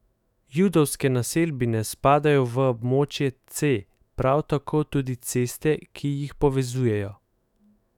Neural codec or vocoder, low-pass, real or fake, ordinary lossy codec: autoencoder, 48 kHz, 128 numbers a frame, DAC-VAE, trained on Japanese speech; 19.8 kHz; fake; none